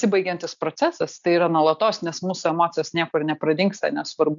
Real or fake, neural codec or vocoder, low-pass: real; none; 7.2 kHz